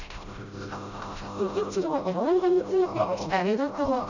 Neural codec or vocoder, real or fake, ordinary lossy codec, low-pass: codec, 16 kHz, 0.5 kbps, FreqCodec, smaller model; fake; none; 7.2 kHz